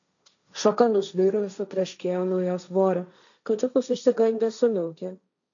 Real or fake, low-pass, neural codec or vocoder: fake; 7.2 kHz; codec, 16 kHz, 1.1 kbps, Voila-Tokenizer